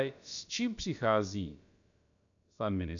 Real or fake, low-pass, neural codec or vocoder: fake; 7.2 kHz; codec, 16 kHz, about 1 kbps, DyCAST, with the encoder's durations